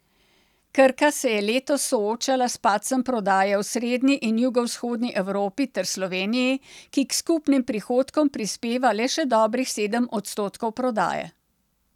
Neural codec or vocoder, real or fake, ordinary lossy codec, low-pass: none; real; none; 19.8 kHz